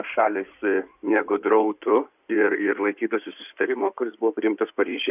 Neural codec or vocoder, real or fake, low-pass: codec, 16 kHz in and 24 kHz out, 2.2 kbps, FireRedTTS-2 codec; fake; 3.6 kHz